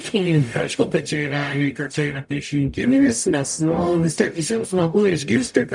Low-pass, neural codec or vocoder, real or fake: 10.8 kHz; codec, 44.1 kHz, 0.9 kbps, DAC; fake